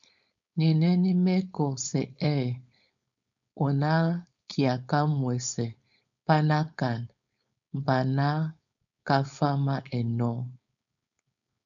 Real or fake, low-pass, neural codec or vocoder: fake; 7.2 kHz; codec, 16 kHz, 4.8 kbps, FACodec